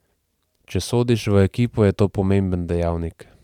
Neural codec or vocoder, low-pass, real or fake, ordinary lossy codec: vocoder, 44.1 kHz, 128 mel bands every 512 samples, BigVGAN v2; 19.8 kHz; fake; none